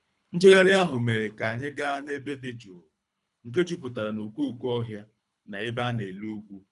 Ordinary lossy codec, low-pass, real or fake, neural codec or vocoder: none; 10.8 kHz; fake; codec, 24 kHz, 3 kbps, HILCodec